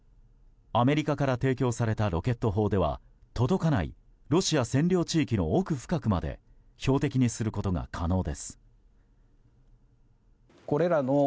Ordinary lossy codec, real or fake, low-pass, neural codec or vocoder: none; real; none; none